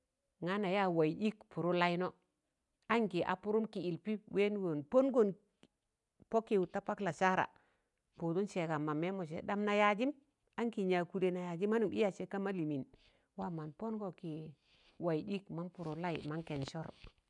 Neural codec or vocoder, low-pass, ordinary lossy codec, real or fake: none; 10.8 kHz; none; real